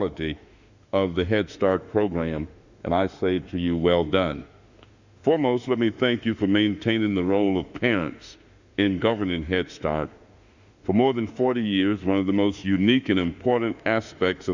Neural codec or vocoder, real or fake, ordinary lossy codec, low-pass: autoencoder, 48 kHz, 32 numbers a frame, DAC-VAE, trained on Japanese speech; fake; Opus, 64 kbps; 7.2 kHz